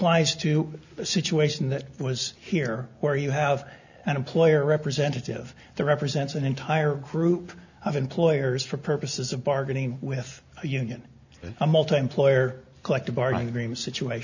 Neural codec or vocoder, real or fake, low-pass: none; real; 7.2 kHz